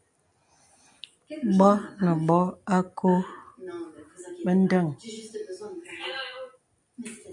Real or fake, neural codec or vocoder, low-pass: real; none; 10.8 kHz